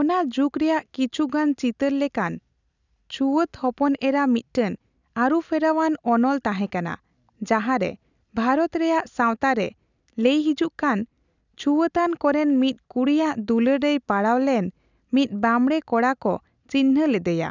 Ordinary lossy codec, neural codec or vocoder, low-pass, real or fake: none; none; 7.2 kHz; real